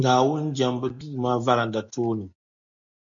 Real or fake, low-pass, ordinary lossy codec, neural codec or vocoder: real; 7.2 kHz; MP3, 64 kbps; none